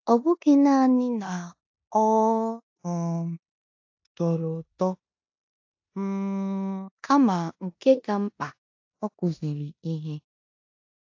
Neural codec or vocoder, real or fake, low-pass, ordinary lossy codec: codec, 16 kHz in and 24 kHz out, 0.9 kbps, LongCat-Audio-Codec, four codebook decoder; fake; 7.2 kHz; AAC, 48 kbps